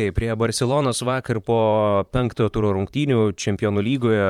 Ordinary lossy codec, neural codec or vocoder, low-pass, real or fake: MP3, 96 kbps; vocoder, 44.1 kHz, 128 mel bands, Pupu-Vocoder; 19.8 kHz; fake